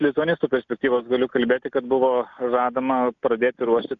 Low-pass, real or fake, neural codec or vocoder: 7.2 kHz; real; none